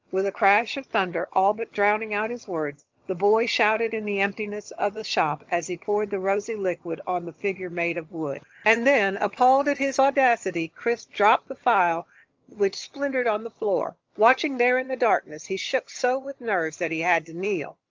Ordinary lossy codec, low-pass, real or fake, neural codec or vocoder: Opus, 24 kbps; 7.2 kHz; fake; vocoder, 22.05 kHz, 80 mel bands, HiFi-GAN